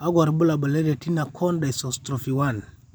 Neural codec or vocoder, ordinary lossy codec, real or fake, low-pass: vocoder, 44.1 kHz, 128 mel bands every 512 samples, BigVGAN v2; none; fake; none